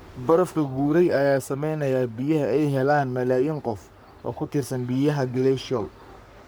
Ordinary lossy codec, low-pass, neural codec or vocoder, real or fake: none; none; codec, 44.1 kHz, 3.4 kbps, Pupu-Codec; fake